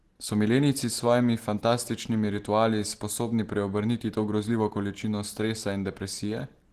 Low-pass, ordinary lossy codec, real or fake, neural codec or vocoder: 14.4 kHz; Opus, 16 kbps; real; none